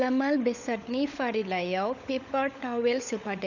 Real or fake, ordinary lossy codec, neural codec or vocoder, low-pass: fake; none; codec, 16 kHz, 16 kbps, FunCodec, trained on LibriTTS, 50 frames a second; 7.2 kHz